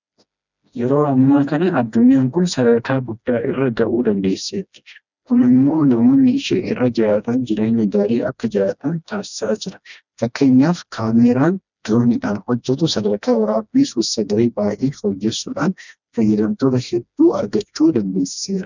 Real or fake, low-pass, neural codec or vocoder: fake; 7.2 kHz; codec, 16 kHz, 1 kbps, FreqCodec, smaller model